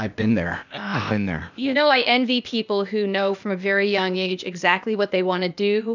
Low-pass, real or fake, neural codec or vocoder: 7.2 kHz; fake; codec, 16 kHz, 0.8 kbps, ZipCodec